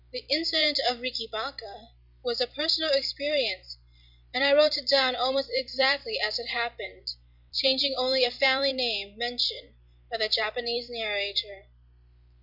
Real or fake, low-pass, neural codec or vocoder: fake; 5.4 kHz; vocoder, 44.1 kHz, 128 mel bands every 256 samples, BigVGAN v2